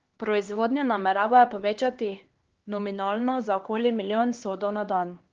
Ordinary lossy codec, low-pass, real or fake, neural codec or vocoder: Opus, 16 kbps; 7.2 kHz; fake; codec, 16 kHz, 2 kbps, X-Codec, HuBERT features, trained on LibriSpeech